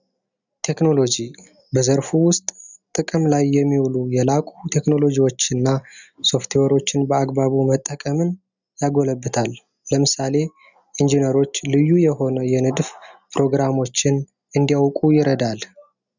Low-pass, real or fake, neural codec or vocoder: 7.2 kHz; real; none